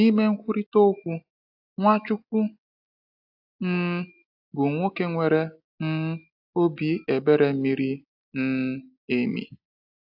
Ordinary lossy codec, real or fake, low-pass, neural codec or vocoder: AAC, 48 kbps; real; 5.4 kHz; none